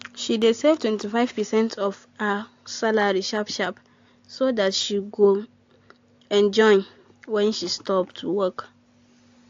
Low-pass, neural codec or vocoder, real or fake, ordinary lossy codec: 7.2 kHz; none; real; AAC, 48 kbps